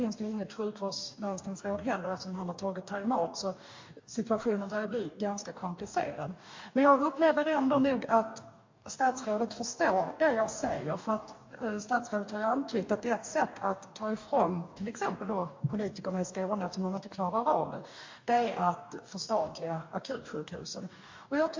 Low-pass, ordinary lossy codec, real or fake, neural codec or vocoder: 7.2 kHz; MP3, 48 kbps; fake; codec, 44.1 kHz, 2.6 kbps, DAC